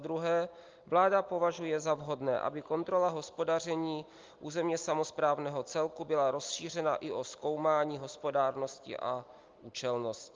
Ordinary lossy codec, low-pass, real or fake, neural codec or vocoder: Opus, 32 kbps; 7.2 kHz; real; none